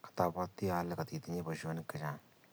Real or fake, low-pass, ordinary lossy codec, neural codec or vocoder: real; none; none; none